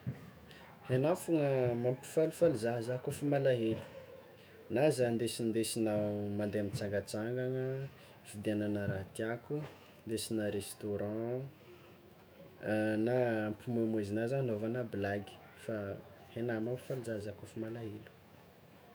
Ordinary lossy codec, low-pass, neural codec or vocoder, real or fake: none; none; autoencoder, 48 kHz, 128 numbers a frame, DAC-VAE, trained on Japanese speech; fake